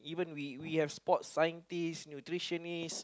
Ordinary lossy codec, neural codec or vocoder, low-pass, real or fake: none; none; none; real